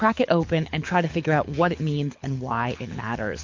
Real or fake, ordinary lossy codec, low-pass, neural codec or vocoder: fake; MP3, 48 kbps; 7.2 kHz; codec, 24 kHz, 6 kbps, HILCodec